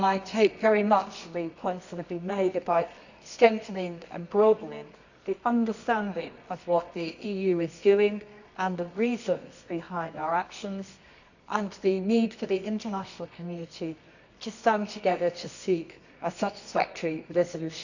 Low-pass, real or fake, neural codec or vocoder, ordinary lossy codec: 7.2 kHz; fake; codec, 24 kHz, 0.9 kbps, WavTokenizer, medium music audio release; none